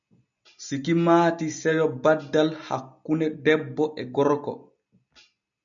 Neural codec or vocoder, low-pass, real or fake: none; 7.2 kHz; real